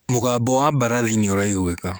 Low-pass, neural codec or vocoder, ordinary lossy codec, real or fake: none; codec, 44.1 kHz, 7.8 kbps, DAC; none; fake